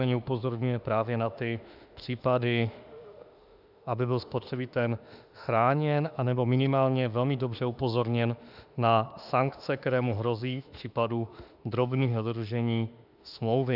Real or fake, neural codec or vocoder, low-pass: fake; autoencoder, 48 kHz, 32 numbers a frame, DAC-VAE, trained on Japanese speech; 5.4 kHz